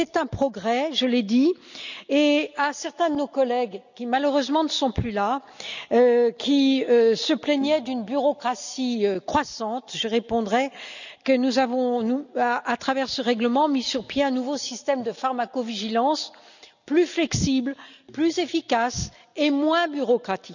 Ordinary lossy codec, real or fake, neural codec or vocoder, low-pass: none; real; none; 7.2 kHz